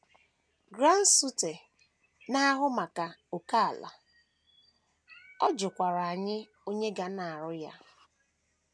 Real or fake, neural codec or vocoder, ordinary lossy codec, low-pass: real; none; none; none